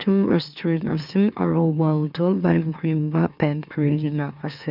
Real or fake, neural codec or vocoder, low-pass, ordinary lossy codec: fake; autoencoder, 44.1 kHz, a latent of 192 numbers a frame, MeloTTS; 5.4 kHz; none